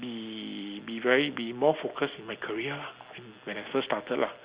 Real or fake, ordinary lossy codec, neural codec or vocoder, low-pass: real; Opus, 64 kbps; none; 3.6 kHz